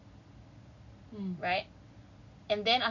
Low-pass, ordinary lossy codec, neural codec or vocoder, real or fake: 7.2 kHz; none; none; real